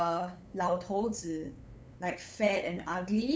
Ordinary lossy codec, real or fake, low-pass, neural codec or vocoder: none; fake; none; codec, 16 kHz, 8 kbps, FunCodec, trained on LibriTTS, 25 frames a second